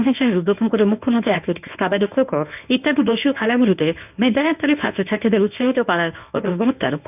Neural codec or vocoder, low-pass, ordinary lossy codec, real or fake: codec, 24 kHz, 0.9 kbps, WavTokenizer, medium speech release version 1; 3.6 kHz; none; fake